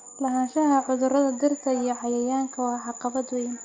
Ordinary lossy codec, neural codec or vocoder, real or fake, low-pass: Opus, 32 kbps; none; real; 7.2 kHz